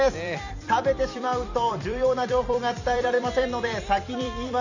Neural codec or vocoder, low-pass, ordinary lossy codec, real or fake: vocoder, 44.1 kHz, 128 mel bands every 256 samples, BigVGAN v2; 7.2 kHz; none; fake